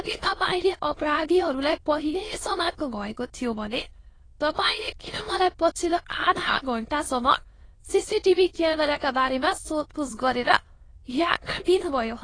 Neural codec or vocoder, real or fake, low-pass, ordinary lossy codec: autoencoder, 22.05 kHz, a latent of 192 numbers a frame, VITS, trained on many speakers; fake; 9.9 kHz; AAC, 32 kbps